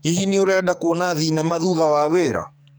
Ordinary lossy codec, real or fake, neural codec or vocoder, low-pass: none; fake; codec, 44.1 kHz, 2.6 kbps, SNAC; none